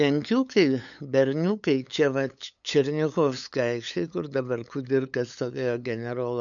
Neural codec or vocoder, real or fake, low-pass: codec, 16 kHz, 8 kbps, FunCodec, trained on LibriTTS, 25 frames a second; fake; 7.2 kHz